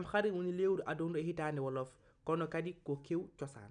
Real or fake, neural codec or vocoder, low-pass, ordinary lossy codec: real; none; 9.9 kHz; none